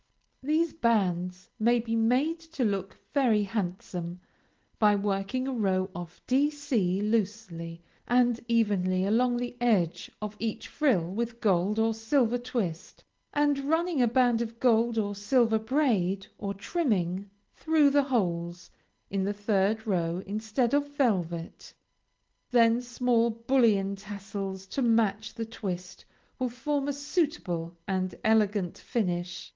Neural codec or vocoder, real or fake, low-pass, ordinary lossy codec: none; real; 7.2 kHz; Opus, 16 kbps